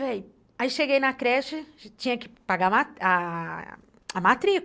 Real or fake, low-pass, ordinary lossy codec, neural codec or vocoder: real; none; none; none